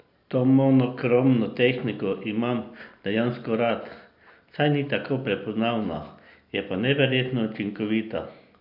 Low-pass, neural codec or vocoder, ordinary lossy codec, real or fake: 5.4 kHz; none; AAC, 48 kbps; real